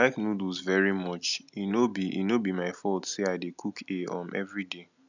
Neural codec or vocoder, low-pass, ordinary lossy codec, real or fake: none; 7.2 kHz; none; real